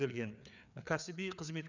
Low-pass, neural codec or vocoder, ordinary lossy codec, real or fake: 7.2 kHz; codec, 16 kHz, 4 kbps, FreqCodec, larger model; none; fake